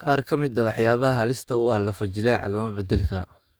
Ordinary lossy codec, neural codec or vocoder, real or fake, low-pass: none; codec, 44.1 kHz, 2.6 kbps, DAC; fake; none